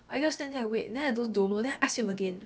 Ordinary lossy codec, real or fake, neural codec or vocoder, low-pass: none; fake; codec, 16 kHz, about 1 kbps, DyCAST, with the encoder's durations; none